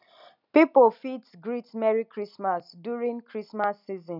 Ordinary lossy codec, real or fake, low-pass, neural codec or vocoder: none; real; 5.4 kHz; none